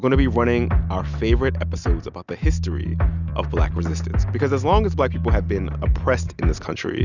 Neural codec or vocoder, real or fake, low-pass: none; real; 7.2 kHz